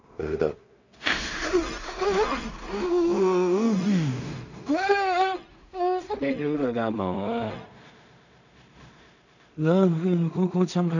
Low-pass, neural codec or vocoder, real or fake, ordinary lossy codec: 7.2 kHz; codec, 16 kHz in and 24 kHz out, 0.4 kbps, LongCat-Audio-Codec, two codebook decoder; fake; none